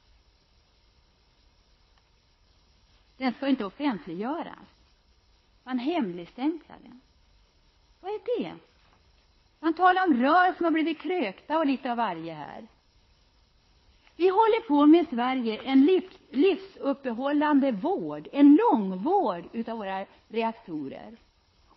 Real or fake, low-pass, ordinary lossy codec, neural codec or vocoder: fake; 7.2 kHz; MP3, 24 kbps; codec, 24 kHz, 6 kbps, HILCodec